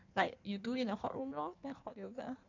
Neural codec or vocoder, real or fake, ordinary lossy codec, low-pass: codec, 16 kHz in and 24 kHz out, 1.1 kbps, FireRedTTS-2 codec; fake; none; 7.2 kHz